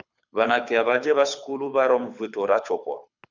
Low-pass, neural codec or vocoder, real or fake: 7.2 kHz; codec, 24 kHz, 6 kbps, HILCodec; fake